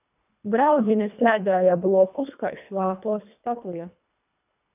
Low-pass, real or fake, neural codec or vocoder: 3.6 kHz; fake; codec, 24 kHz, 1.5 kbps, HILCodec